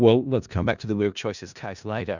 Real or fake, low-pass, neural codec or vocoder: fake; 7.2 kHz; codec, 16 kHz in and 24 kHz out, 0.4 kbps, LongCat-Audio-Codec, four codebook decoder